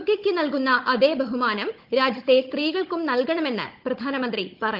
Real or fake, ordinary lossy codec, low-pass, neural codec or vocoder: fake; Opus, 32 kbps; 5.4 kHz; codec, 16 kHz, 16 kbps, FunCodec, trained on Chinese and English, 50 frames a second